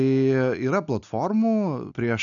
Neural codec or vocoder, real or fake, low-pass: none; real; 7.2 kHz